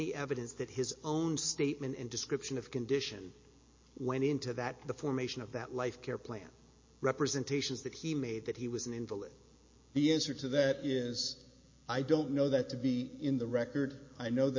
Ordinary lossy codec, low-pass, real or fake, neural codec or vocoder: MP3, 32 kbps; 7.2 kHz; real; none